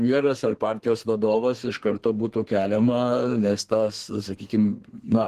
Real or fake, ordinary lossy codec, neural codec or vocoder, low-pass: fake; Opus, 16 kbps; codec, 32 kHz, 1.9 kbps, SNAC; 14.4 kHz